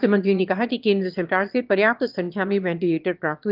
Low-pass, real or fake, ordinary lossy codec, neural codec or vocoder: 5.4 kHz; fake; Opus, 32 kbps; autoencoder, 22.05 kHz, a latent of 192 numbers a frame, VITS, trained on one speaker